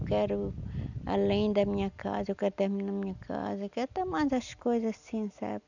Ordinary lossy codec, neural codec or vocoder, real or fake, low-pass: none; none; real; 7.2 kHz